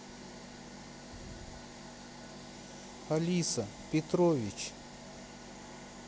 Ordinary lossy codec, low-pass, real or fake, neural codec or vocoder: none; none; real; none